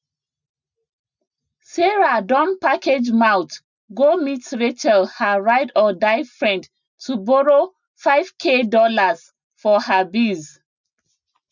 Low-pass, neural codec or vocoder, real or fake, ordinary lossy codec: 7.2 kHz; none; real; none